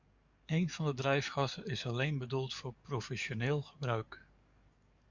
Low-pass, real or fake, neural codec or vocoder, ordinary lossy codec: 7.2 kHz; fake; codec, 44.1 kHz, 7.8 kbps, DAC; Opus, 64 kbps